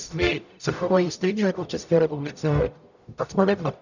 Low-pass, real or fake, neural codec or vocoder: 7.2 kHz; fake; codec, 44.1 kHz, 0.9 kbps, DAC